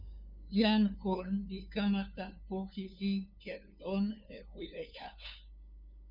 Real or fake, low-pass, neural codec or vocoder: fake; 5.4 kHz; codec, 16 kHz, 2 kbps, FunCodec, trained on LibriTTS, 25 frames a second